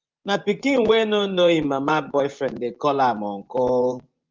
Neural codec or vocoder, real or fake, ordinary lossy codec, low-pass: vocoder, 24 kHz, 100 mel bands, Vocos; fake; Opus, 32 kbps; 7.2 kHz